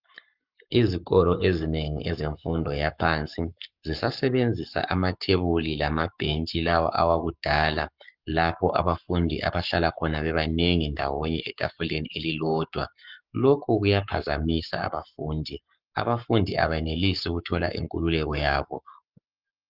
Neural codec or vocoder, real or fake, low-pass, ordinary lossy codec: codec, 44.1 kHz, 7.8 kbps, Pupu-Codec; fake; 5.4 kHz; Opus, 24 kbps